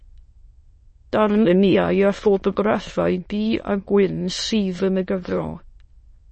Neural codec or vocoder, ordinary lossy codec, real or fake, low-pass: autoencoder, 22.05 kHz, a latent of 192 numbers a frame, VITS, trained on many speakers; MP3, 32 kbps; fake; 9.9 kHz